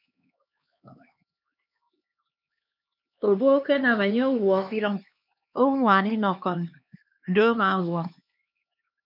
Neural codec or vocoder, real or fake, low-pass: codec, 16 kHz, 2 kbps, X-Codec, HuBERT features, trained on LibriSpeech; fake; 5.4 kHz